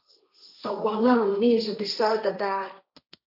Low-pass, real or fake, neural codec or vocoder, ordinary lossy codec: 5.4 kHz; fake; codec, 16 kHz, 1.1 kbps, Voila-Tokenizer; AAC, 48 kbps